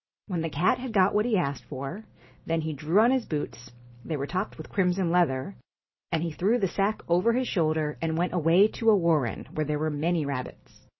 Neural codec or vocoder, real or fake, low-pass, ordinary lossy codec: none; real; 7.2 kHz; MP3, 24 kbps